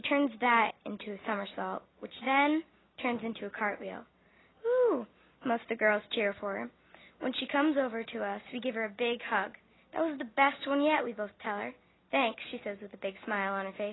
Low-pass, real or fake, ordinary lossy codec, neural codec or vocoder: 7.2 kHz; real; AAC, 16 kbps; none